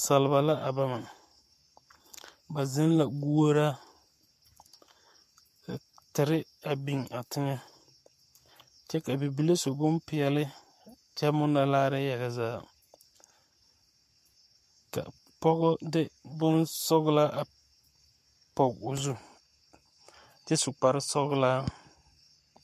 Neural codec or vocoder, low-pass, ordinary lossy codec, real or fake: codec, 44.1 kHz, 7.8 kbps, Pupu-Codec; 14.4 kHz; MP3, 64 kbps; fake